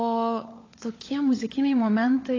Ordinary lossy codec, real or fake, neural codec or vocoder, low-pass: AAC, 32 kbps; fake; codec, 16 kHz, 16 kbps, FunCodec, trained on LibriTTS, 50 frames a second; 7.2 kHz